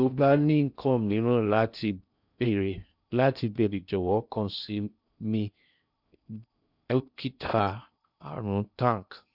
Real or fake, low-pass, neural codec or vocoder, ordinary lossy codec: fake; 5.4 kHz; codec, 16 kHz in and 24 kHz out, 0.6 kbps, FocalCodec, streaming, 2048 codes; none